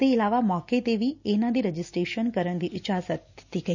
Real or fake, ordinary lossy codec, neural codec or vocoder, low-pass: real; none; none; 7.2 kHz